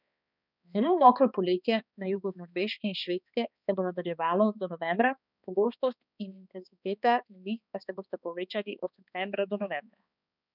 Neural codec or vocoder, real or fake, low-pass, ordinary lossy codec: codec, 16 kHz, 2 kbps, X-Codec, HuBERT features, trained on balanced general audio; fake; 5.4 kHz; none